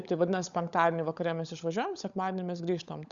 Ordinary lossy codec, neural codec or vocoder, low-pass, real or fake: Opus, 64 kbps; codec, 16 kHz, 16 kbps, FunCodec, trained on LibriTTS, 50 frames a second; 7.2 kHz; fake